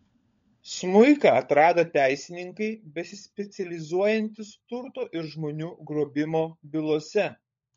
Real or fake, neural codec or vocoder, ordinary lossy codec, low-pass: fake; codec, 16 kHz, 16 kbps, FunCodec, trained on LibriTTS, 50 frames a second; MP3, 48 kbps; 7.2 kHz